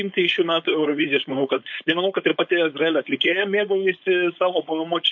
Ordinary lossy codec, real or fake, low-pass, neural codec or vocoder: MP3, 48 kbps; fake; 7.2 kHz; codec, 16 kHz, 4.8 kbps, FACodec